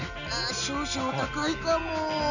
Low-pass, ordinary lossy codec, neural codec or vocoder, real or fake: 7.2 kHz; none; none; real